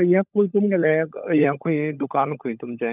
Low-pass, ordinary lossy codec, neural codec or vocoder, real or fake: 3.6 kHz; none; codec, 16 kHz, 16 kbps, FunCodec, trained on LibriTTS, 50 frames a second; fake